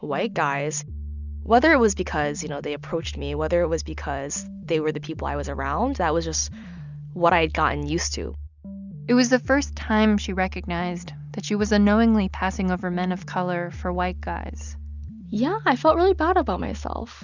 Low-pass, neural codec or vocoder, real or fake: 7.2 kHz; none; real